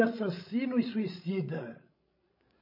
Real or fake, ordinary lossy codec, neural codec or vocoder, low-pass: fake; MP3, 24 kbps; codec, 16 kHz, 16 kbps, FreqCodec, larger model; 5.4 kHz